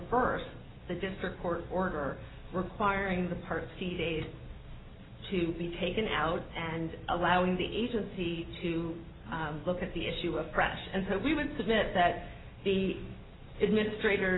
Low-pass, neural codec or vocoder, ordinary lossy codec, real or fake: 7.2 kHz; none; AAC, 16 kbps; real